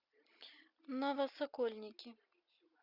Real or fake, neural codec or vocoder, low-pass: real; none; 5.4 kHz